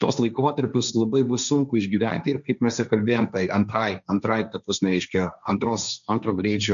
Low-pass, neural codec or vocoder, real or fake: 7.2 kHz; codec, 16 kHz, 1.1 kbps, Voila-Tokenizer; fake